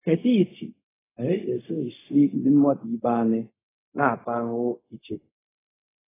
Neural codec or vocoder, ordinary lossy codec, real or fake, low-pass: codec, 16 kHz, 0.4 kbps, LongCat-Audio-Codec; AAC, 16 kbps; fake; 3.6 kHz